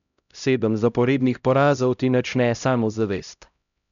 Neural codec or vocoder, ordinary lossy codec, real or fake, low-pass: codec, 16 kHz, 0.5 kbps, X-Codec, HuBERT features, trained on LibriSpeech; none; fake; 7.2 kHz